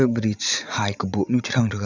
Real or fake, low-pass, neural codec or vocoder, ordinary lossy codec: fake; 7.2 kHz; vocoder, 44.1 kHz, 80 mel bands, Vocos; none